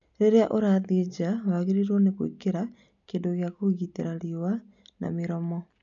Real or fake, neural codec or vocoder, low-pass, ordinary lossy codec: real; none; 7.2 kHz; none